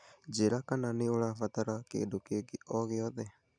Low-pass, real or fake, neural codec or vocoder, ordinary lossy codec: 9.9 kHz; real; none; none